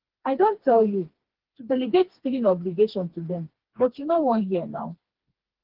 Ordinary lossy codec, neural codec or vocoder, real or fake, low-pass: Opus, 16 kbps; codec, 16 kHz, 2 kbps, FreqCodec, smaller model; fake; 5.4 kHz